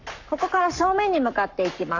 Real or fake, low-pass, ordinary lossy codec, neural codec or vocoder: fake; 7.2 kHz; none; codec, 44.1 kHz, 7.8 kbps, Pupu-Codec